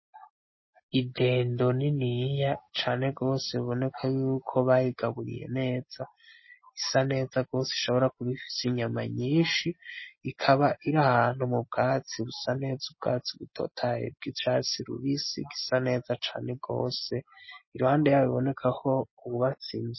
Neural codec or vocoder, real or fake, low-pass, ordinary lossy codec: none; real; 7.2 kHz; MP3, 24 kbps